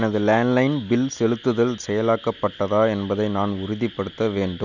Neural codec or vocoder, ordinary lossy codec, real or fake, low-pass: none; none; real; 7.2 kHz